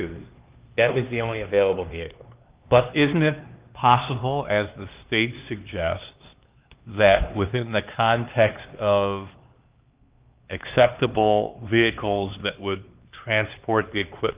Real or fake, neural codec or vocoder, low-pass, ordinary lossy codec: fake; codec, 16 kHz, 2 kbps, X-Codec, HuBERT features, trained on LibriSpeech; 3.6 kHz; Opus, 32 kbps